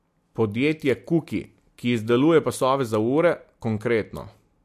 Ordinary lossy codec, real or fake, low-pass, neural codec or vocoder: MP3, 64 kbps; real; 14.4 kHz; none